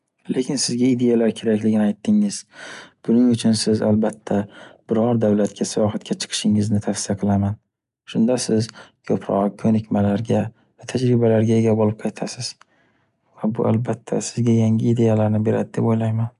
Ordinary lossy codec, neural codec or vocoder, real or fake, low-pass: none; none; real; 10.8 kHz